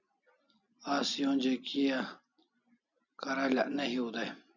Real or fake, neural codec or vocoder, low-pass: real; none; 7.2 kHz